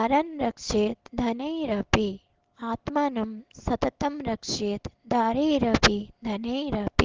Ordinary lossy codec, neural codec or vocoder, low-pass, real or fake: Opus, 16 kbps; none; 7.2 kHz; real